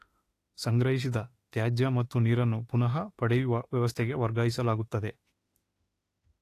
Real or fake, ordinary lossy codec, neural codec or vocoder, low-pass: fake; AAC, 48 kbps; autoencoder, 48 kHz, 32 numbers a frame, DAC-VAE, trained on Japanese speech; 14.4 kHz